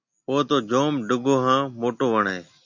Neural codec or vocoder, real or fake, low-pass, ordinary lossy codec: none; real; 7.2 kHz; MP3, 64 kbps